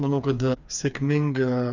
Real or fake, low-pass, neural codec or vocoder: fake; 7.2 kHz; codec, 16 kHz, 4 kbps, FreqCodec, smaller model